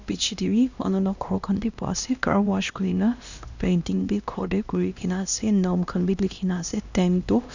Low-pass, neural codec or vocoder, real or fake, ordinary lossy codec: 7.2 kHz; codec, 16 kHz, 1 kbps, X-Codec, HuBERT features, trained on LibriSpeech; fake; none